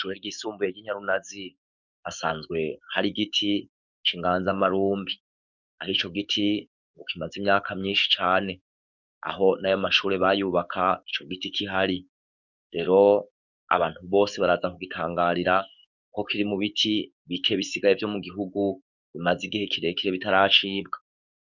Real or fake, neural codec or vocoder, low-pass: fake; codec, 44.1 kHz, 7.8 kbps, DAC; 7.2 kHz